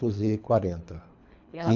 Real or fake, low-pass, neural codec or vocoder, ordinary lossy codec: fake; 7.2 kHz; codec, 24 kHz, 3 kbps, HILCodec; none